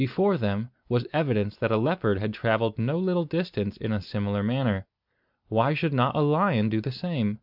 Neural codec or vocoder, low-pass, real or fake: none; 5.4 kHz; real